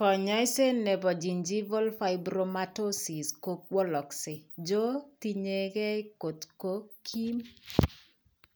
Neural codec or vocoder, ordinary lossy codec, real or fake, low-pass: none; none; real; none